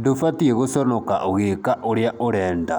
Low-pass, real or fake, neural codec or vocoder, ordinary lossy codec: none; real; none; none